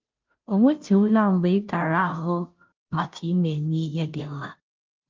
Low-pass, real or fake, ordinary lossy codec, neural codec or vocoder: 7.2 kHz; fake; Opus, 24 kbps; codec, 16 kHz, 0.5 kbps, FunCodec, trained on Chinese and English, 25 frames a second